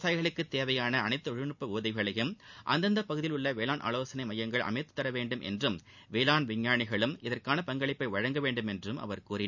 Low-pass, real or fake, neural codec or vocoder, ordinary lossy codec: 7.2 kHz; real; none; none